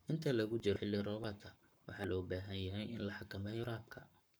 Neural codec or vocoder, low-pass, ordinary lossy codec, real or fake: codec, 44.1 kHz, 7.8 kbps, Pupu-Codec; none; none; fake